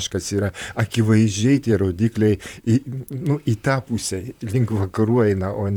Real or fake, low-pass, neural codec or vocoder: fake; 19.8 kHz; vocoder, 44.1 kHz, 128 mel bands, Pupu-Vocoder